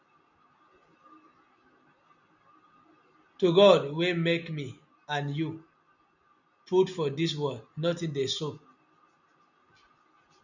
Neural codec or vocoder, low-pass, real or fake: none; 7.2 kHz; real